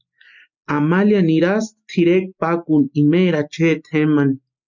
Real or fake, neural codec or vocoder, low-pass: real; none; 7.2 kHz